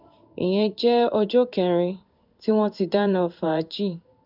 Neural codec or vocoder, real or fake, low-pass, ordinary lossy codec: codec, 16 kHz in and 24 kHz out, 1 kbps, XY-Tokenizer; fake; 5.4 kHz; none